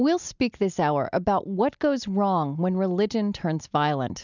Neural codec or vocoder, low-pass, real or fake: none; 7.2 kHz; real